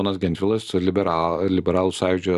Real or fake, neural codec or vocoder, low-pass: real; none; 14.4 kHz